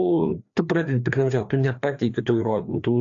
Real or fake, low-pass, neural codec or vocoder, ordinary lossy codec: fake; 7.2 kHz; codec, 16 kHz, 2 kbps, FreqCodec, larger model; AAC, 48 kbps